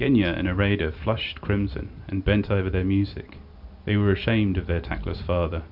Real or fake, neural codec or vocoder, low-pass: real; none; 5.4 kHz